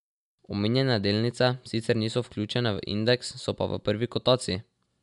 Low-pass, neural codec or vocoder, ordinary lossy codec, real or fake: 10.8 kHz; none; none; real